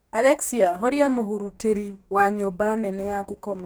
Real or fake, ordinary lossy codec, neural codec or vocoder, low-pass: fake; none; codec, 44.1 kHz, 2.6 kbps, DAC; none